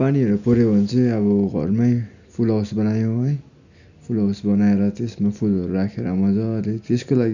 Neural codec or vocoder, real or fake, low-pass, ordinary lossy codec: none; real; 7.2 kHz; none